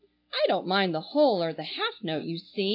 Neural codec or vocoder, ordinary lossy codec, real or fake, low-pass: none; AAC, 32 kbps; real; 5.4 kHz